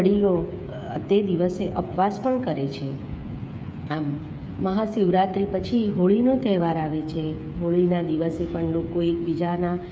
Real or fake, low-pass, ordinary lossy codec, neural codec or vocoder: fake; none; none; codec, 16 kHz, 8 kbps, FreqCodec, smaller model